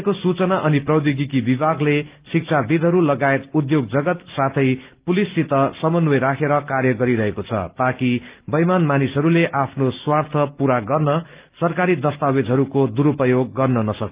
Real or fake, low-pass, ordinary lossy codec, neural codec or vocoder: real; 3.6 kHz; Opus, 32 kbps; none